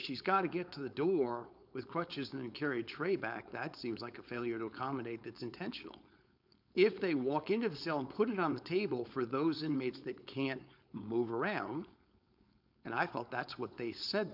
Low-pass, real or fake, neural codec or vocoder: 5.4 kHz; fake; codec, 16 kHz, 4.8 kbps, FACodec